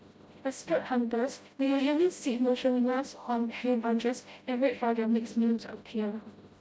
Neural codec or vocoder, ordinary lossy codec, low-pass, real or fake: codec, 16 kHz, 0.5 kbps, FreqCodec, smaller model; none; none; fake